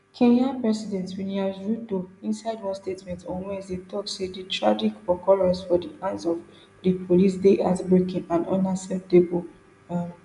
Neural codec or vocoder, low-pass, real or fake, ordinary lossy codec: none; 10.8 kHz; real; none